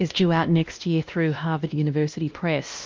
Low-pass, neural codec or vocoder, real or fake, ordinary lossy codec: 7.2 kHz; codec, 16 kHz, 1 kbps, X-Codec, WavLM features, trained on Multilingual LibriSpeech; fake; Opus, 24 kbps